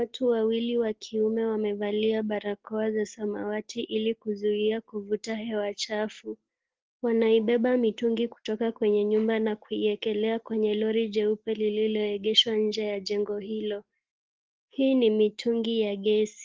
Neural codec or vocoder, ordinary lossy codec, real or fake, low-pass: none; Opus, 16 kbps; real; 7.2 kHz